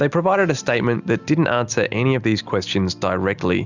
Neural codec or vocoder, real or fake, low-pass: none; real; 7.2 kHz